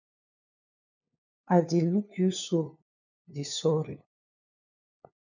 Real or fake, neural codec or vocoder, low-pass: fake; codec, 16 kHz, 2 kbps, FunCodec, trained on LibriTTS, 25 frames a second; 7.2 kHz